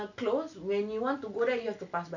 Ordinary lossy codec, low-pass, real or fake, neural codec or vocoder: none; 7.2 kHz; real; none